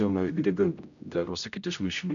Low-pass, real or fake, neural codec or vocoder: 7.2 kHz; fake; codec, 16 kHz, 0.5 kbps, X-Codec, HuBERT features, trained on general audio